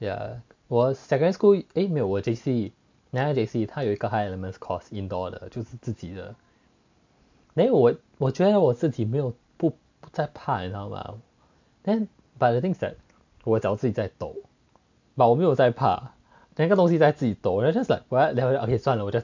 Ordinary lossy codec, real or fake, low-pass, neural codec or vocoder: MP3, 64 kbps; real; 7.2 kHz; none